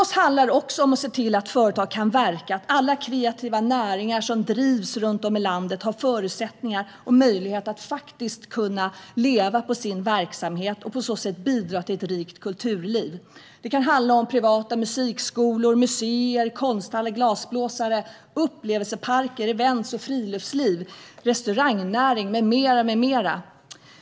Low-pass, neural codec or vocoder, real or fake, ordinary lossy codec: none; none; real; none